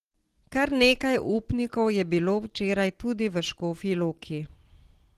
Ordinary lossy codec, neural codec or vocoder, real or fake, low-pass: Opus, 16 kbps; none; real; 14.4 kHz